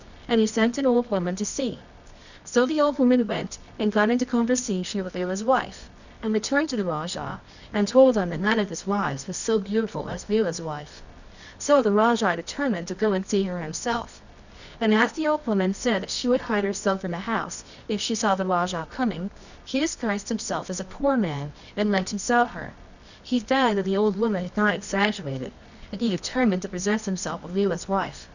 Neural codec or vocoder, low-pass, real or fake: codec, 24 kHz, 0.9 kbps, WavTokenizer, medium music audio release; 7.2 kHz; fake